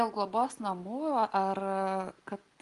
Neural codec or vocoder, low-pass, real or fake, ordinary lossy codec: none; 10.8 kHz; real; Opus, 32 kbps